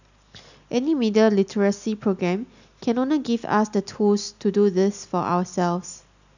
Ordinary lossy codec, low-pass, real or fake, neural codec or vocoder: none; 7.2 kHz; real; none